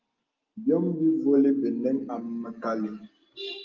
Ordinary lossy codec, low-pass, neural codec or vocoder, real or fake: Opus, 32 kbps; 7.2 kHz; none; real